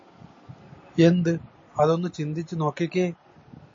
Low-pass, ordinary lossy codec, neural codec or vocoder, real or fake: 7.2 kHz; MP3, 32 kbps; none; real